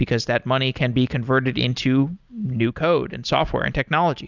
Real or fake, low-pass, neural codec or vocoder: real; 7.2 kHz; none